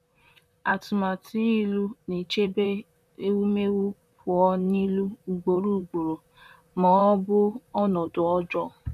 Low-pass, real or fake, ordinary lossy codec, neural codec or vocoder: 14.4 kHz; fake; none; vocoder, 44.1 kHz, 128 mel bands, Pupu-Vocoder